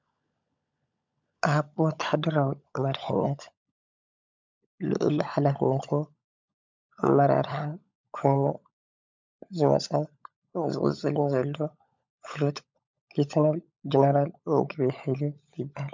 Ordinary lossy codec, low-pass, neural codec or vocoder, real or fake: MP3, 64 kbps; 7.2 kHz; codec, 16 kHz, 16 kbps, FunCodec, trained on LibriTTS, 50 frames a second; fake